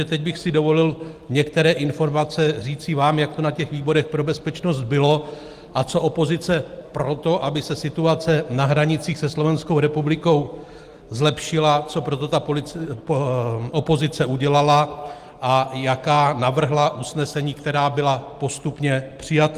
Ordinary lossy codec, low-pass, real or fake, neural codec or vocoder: Opus, 24 kbps; 14.4 kHz; real; none